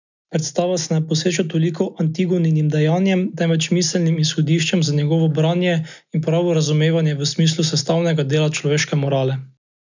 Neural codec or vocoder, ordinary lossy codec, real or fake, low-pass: none; none; real; 7.2 kHz